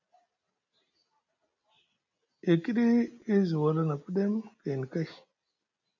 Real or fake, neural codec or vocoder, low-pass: real; none; 7.2 kHz